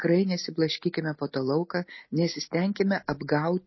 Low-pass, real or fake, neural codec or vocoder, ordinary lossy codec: 7.2 kHz; real; none; MP3, 24 kbps